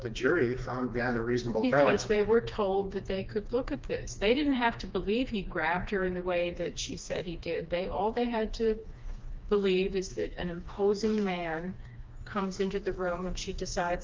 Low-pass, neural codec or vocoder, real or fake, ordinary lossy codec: 7.2 kHz; codec, 16 kHz, 2 kbps, FreqCodec, smaller model; fake; Opus, 24 kbps